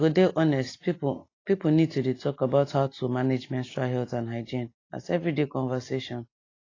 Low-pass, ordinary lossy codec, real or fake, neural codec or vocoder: 7.2 kHz; AAC, 32 kbps; real; none